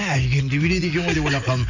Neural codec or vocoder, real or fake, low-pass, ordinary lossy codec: none; real; 7.2 kHz; none